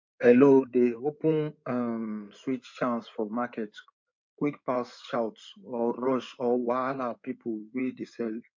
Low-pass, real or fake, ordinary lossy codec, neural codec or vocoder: 7.2 kHz; fake; MP3, 48 kbps; codec, 16 kHz in and 24 kHz out, 2.2 kbps, FireRedTTS-2 codec